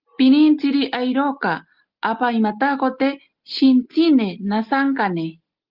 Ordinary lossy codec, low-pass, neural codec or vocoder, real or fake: Opus, 24 kbps; 5.4 kHz; none; real